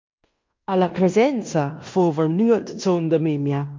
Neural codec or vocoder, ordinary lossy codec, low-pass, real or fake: codec, 16 kHz in and 24 kHz out, 0.9 kbps, LongCat-Audio-Codec, fine tuned four codebook decoder; MP3, 48 kbps; 7.2 kHz; fake